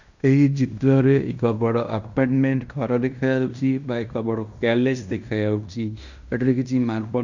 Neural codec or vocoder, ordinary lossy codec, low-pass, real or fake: codec, 16 kHz in and 24 kHz out, 0.9 kbps, LongCat-Audio-Codec, fine tuned four codebook decoder; none; 7.2 kHz; fake